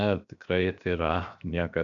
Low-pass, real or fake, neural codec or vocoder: 7.2 kHz; fake; codec, 16 kHz, 0.7 kbps, FocalCodec